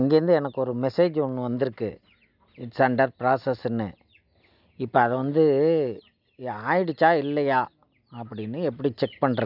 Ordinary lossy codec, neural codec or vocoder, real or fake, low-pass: none; none; real; 5.4 kHz